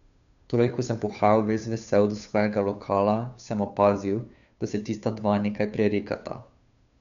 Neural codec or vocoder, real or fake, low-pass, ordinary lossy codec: codec, 16 kHz, 2 kbps, FunCodec, trained on Chinese and English, 25 frames a second; fake; 7.2 kHz; none